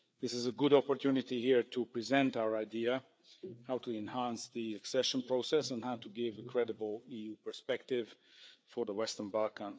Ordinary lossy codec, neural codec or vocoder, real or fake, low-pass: none; codec, 16 kHz, 4 kbps, FreqCodec, larger model; fake; none